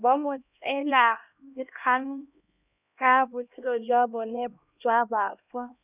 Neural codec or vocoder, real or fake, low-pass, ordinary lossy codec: codec, 16 kHz, 1 kbps, X-Codec, HuBERT features, trained on LibriSpeech; fake; 3.6 kHz; none